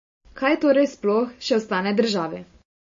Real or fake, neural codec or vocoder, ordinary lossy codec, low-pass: real; none; MP3, 32 kbps; 7.2 kHz